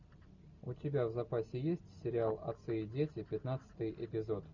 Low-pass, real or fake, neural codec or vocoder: 7.2 kHz; real; none